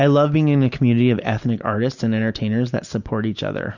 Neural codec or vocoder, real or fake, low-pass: none; real; 7.2 kHz